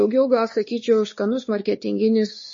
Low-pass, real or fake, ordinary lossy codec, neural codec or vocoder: 7.2 kHz; fake; MP3, 32 kbps; codec, 16 kHz, 2 kbps, X-Codec, WavLM features, trained on Multilingual LibriSpeech